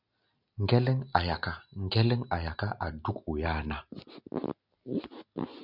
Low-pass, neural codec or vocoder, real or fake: 5.4 kHz; none; real